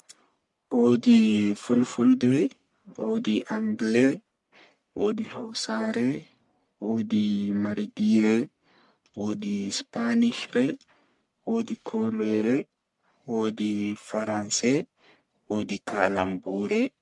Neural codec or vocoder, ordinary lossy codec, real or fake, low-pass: codec, 44.1 kHz, 1.7 kbps, Pupu-Codec; MP3, 64 kbps; fake; 10.8 kHz